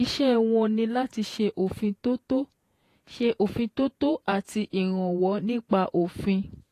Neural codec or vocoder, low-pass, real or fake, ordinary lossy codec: vocoder, 48 kHz, 128 mel bands, Vocos; 14.4 kHz; fake; AAC, 48 kbps